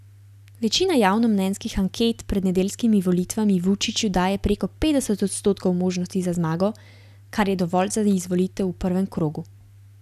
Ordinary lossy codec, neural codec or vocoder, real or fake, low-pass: none; none; real; 14.4 kHz